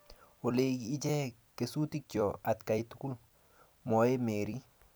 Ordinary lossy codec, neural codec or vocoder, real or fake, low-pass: none; vocoder, 44.1 kHz, 128 mel bands every 256 samples, BigVGAN v2; fake; none